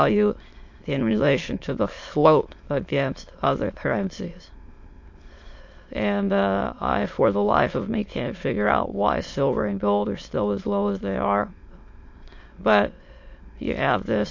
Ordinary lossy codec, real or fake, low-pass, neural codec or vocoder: MP3, 48 kbps; fake; 7.2 kHz; autoencoder, 22.05 kHz, a latent of 192 numbers a frame, VITS, trained on many speakers